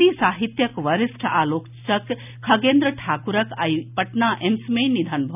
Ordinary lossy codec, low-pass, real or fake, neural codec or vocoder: none; 3.6 kHz; real; none